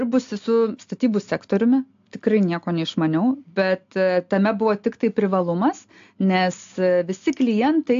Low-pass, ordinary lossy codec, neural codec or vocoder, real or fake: 7.2 kHz; AAC, 64 kbps; none; real